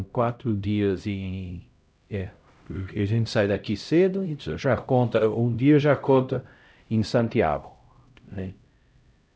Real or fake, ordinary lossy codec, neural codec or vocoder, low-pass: fake; none; codec, 16 kHz, 0.5 kbps, X-Codec, HuBERT features, trained on LibriSpeech; none